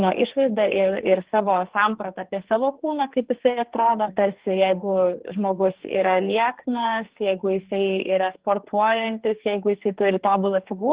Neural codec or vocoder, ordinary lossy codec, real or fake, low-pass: codec, 44.1 kHz, 2.6 kbps, SNAC; Opus, 16 kbps; fake; 3.6 kHz